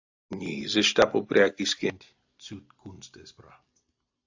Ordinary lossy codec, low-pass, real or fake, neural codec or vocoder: AAC, 48 kbps; 7.2 kHz; real; none